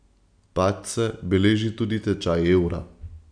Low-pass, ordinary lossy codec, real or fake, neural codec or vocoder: 9.9 kHz; none; real; none